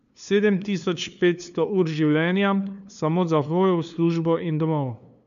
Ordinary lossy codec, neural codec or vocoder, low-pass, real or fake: none; codec, 16 kHz, 2 kbps, FunCodec, trained on LibriTTS, 25 frames a second; 7.2 kHz; fake